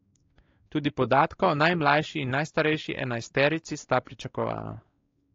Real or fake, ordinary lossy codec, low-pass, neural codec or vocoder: fake; AAC, 32 kbps; 7.2 kHz; codec, 16 kHz, 4 kbps, X-Codec, WavLM features, trained on Multilingual LibriSpeech